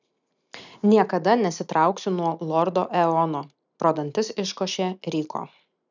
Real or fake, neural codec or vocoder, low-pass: real; none; 7.2 kHz